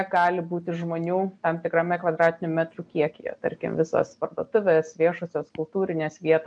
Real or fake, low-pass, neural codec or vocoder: real; 9.9 kHz; none